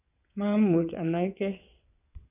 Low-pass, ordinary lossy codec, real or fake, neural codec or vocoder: 3.6 kHz; none; fake; vocoder, 22.05 kHz, 80 mel bands, WaveNeXt